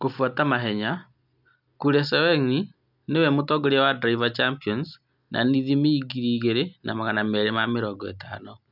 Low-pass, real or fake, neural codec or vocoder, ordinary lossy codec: 5.4 kHz; real; none; none